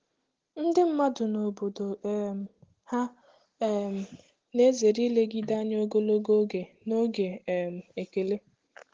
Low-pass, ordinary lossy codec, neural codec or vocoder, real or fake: 7.2 kHz; Opus, 16 kbps; none; real